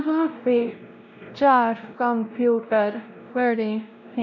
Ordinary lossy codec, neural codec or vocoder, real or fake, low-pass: none; codec, 16 kHz, 0.5 kbps, X-Codec, WavLM features, trained on Multilingual LibriSpeech; fake; 7.2 kHz